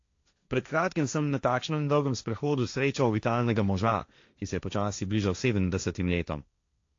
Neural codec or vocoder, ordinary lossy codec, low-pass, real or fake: codec, 16 kHz, 1.1 kbps, Voila-Tokenizer; AAC, 48 kbps; 7.2 kHz; fake